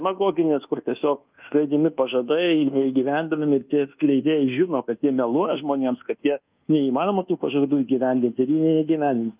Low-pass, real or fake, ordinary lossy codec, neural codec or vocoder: 3.6 kHz; fake; Opus, 24 kbps; codec, 24 kHz, 1.2 kbps, DualCodec